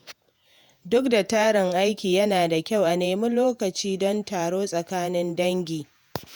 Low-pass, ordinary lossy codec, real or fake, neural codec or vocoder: none; none; fake; vocoder, 48 kHz, 128 mel bands, Vocos